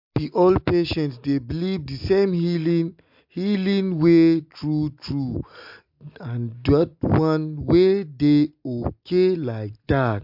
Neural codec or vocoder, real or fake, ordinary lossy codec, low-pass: none; real; MP3, 48 kbps; 5.4 kHz